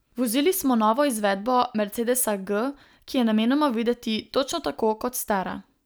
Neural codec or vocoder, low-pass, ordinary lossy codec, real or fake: none; none; none; real